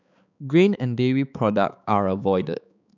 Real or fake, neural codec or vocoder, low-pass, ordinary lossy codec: fake; codec, 16 kHz, 4 kbps, X-Codec, HuBERT features, trained on balanced general audio; 7.2 kHz; none